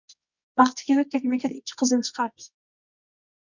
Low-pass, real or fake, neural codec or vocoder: 7.2 kHz; fake; codec, 24 kHz, 0.9 kbps, WavTokenizer, medium music audio release